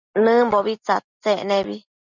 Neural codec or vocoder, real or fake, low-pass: none; real; 7.2 kHz